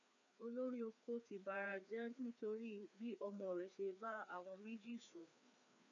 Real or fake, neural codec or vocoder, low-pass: fake; codec, 16 kHz, 2 kbps, FreqCodec, larger model; 7.2 kHz